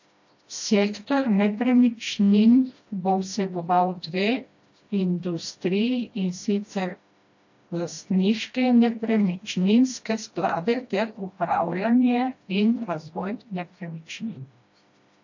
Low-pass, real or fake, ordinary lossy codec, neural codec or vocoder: 7.2 kHz; fake; AAC, 48 kbps; codec, 16 kHz, 1 kbps, FreqCodec, smaller model